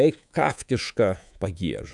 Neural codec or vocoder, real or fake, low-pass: codec, 24 kHz, 3.1 kbps, DualCodec; fake; 10.8 kHz